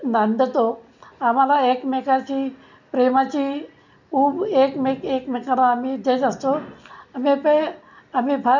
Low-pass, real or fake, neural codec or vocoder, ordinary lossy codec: 7.2 kHz; real; none; none